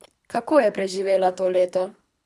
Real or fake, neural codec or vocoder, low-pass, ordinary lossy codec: fake; codec, 24 kHz, 3 kbps, HILCodec; none; none